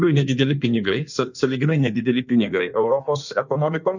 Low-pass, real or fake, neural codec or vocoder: 7.2 kHz; fake; codec, 16 kHz in and 24 kHz out, 1.1 kbps, FireRedTTS-2 codec